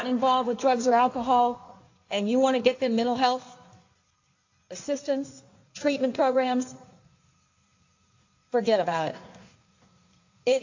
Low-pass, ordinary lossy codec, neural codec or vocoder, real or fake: 7.2 kHz; AAC, 48 kbps; codec, 16 kHz in and 24 kHz out, 1.1 kbps, FireRedTTS-2 codec; fake